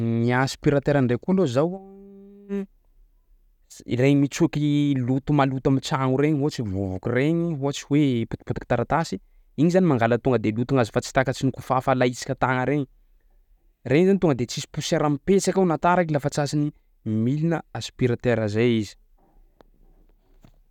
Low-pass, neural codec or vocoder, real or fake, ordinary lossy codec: 19.8 kHz; none; real; none